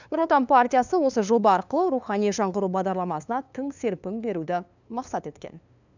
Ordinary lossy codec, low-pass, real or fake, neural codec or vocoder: none; 7.2 kHz; fake; codec, 16 kHz, 2 kbps, FunCodec, trained on LibriTTS, 25 frames a second